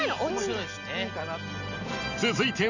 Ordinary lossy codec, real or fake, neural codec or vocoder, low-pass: none; real; none; 7.2 kHz